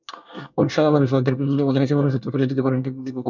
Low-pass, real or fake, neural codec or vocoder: 7.2 kHz; fake; codec, 24 kHz, 1 kbps, SNAC